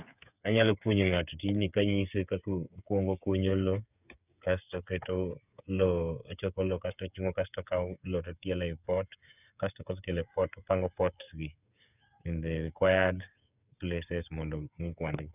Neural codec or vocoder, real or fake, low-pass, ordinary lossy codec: codec, 16 kHz, 8 kbps, FreqCodec, smaller model; fake; 3.6 kHz; none